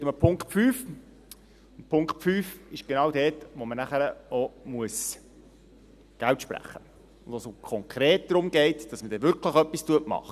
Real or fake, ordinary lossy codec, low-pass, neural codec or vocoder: real; none; 14.4 kHz; none